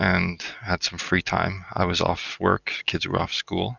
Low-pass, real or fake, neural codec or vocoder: 7.2 kHz; real; none